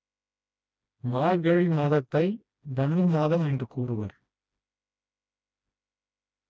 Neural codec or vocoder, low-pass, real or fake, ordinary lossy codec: codec, 16 kHz, 1 kbps, FreqCodec, smaller model; none; fake; none